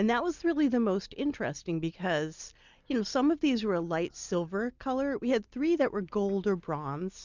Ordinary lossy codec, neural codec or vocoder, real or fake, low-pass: Opus, 64 kbps; none; real; 7.2 kHz